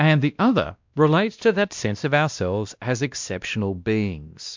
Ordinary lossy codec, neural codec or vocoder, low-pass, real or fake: MP3, 64 kbps; codec, 16 kHz, 1 kbps, X-Codec, WavLM features, trained on Multilingual LibriSpeech; 7.2 kHz; fake